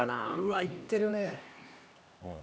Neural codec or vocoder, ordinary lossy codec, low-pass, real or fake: codec, 16 kHz, 2 kbps, X-Codec, HuBERT features, trained on LibriSpeech; none; none; fake